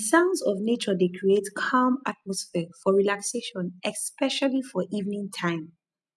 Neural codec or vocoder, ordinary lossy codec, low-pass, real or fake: none; none; none; real